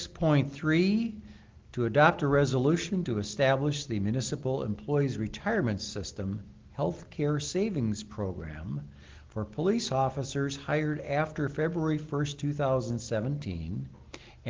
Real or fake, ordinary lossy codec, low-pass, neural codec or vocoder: real; Opus, 16 kbps; 7.2 kHz; none